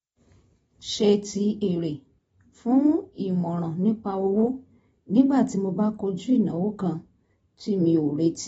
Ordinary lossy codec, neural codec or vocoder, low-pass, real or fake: AAC, 24 kbps; none; 10.8 kHz; real